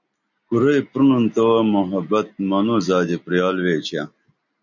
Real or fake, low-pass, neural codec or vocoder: real; 7.2 kHz; none